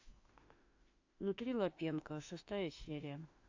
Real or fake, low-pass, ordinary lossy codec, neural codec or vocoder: fake; 7.2 kHz; AAC, 48 kbps; autoencoder, 48 kHz, 32 numbers a frame, DAC-VAE, trained on Japanese speech